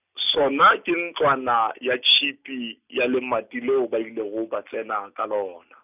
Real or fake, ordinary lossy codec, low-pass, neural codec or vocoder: real; none; 3.6 kHz; none